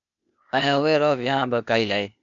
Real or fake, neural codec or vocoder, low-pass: fake; codec, 16 kHz, 0.8 kbps, ZipCodec; 7.2 kHz